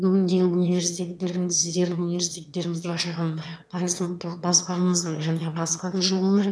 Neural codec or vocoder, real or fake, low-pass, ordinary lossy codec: autoencoder, 22.05 kHz, a latent of 192 numbers a frame, VITS, trained on one speaker; fake; none; none